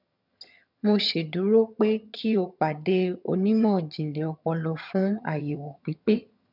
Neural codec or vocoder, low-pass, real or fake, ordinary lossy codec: vocoder, 22.05 kHz, 80 mel bands, HiFi-GAN; 5.4 kHz; fake; none